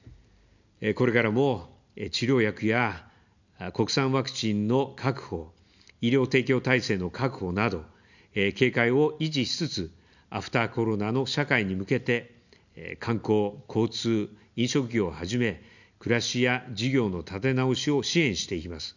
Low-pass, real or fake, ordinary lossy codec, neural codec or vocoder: 7.2 kHz; real; none; none